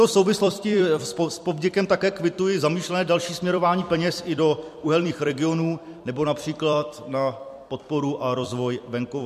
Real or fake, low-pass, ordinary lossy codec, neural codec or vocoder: fake; 14.4 kHz; MP3, 64 kbps; vocoder, 44.1 kHz, 128 mel bands every 256 samples, BigVGAN v2